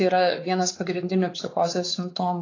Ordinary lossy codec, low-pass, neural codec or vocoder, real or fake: AAC, 32 kbps; 7.2 kHz; codec, 16 kHz, 4 kbps, X-Codec, WavLM features, trained on Multilingual LibriSpeech; fake